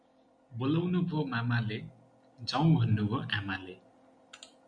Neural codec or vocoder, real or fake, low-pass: none; real; 9.9 kHz